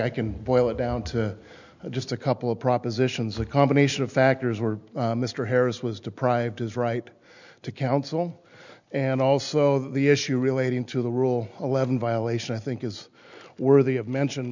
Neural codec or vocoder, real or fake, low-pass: none; real; 7.2 kHz